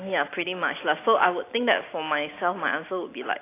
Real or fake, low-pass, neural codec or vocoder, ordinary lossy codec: real; 3.6 kHz; none; AAC, 24 kbps